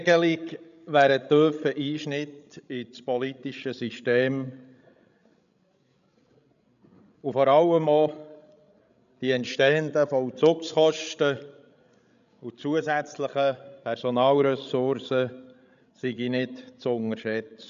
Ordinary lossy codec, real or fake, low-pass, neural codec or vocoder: none; fake; 7.2 kHz; codec, 16 kHz, 16 kbps, FreqCodec, larger model